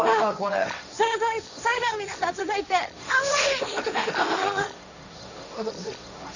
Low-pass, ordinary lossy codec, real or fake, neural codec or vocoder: 7.2 kHz; none; fake; codec, 16 kHz, 1.1 kbps, Voila-Tokenizer